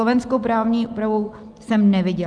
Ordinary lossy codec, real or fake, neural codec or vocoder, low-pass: Opus, 32 kbps; real; none; 9.9 kHz